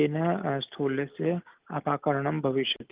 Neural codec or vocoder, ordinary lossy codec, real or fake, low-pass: none; Opus, 24 kbps; real; 3.6 kHz